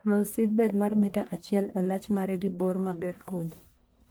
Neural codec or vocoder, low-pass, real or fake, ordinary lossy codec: codec, 44.1 kHz, 1.7 kbps, Pupu-Codec; none; fake; none